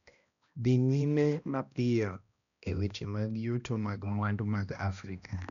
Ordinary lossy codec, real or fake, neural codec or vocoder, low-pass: none; fake; codec, 16 kHz, 1 kbps, X-Codec, HuBERT features, trained on balanced general audio; 7.2 kHz